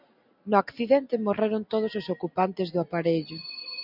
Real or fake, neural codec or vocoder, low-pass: real; none; 5.4 kHz